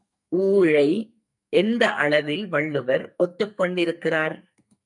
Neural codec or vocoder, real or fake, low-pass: codec, 44.1 kHz, 2.6 kbps, SNAC; fake; 10.8 kHz